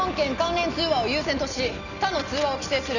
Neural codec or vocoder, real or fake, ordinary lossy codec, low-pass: none; real; none; 7.2 kHz